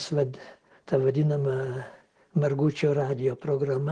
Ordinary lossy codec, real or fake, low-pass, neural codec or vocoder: Opus, 16 kbps; real; 9.9 kHz; none